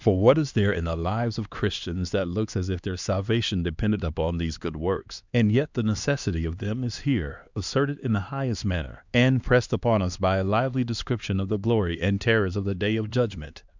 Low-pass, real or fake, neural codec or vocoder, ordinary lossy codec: 7.2 kHz; fake; codec, 16 kHz, 2 kbps, X-Codec, HuBERT features, trained on LibriSpeech; Opus, 64 kbps